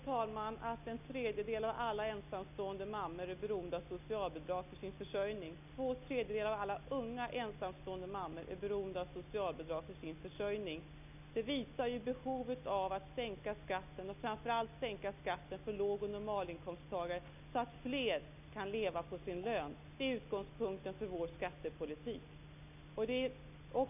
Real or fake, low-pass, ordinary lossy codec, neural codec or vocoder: real; 3.6 kHz; none; none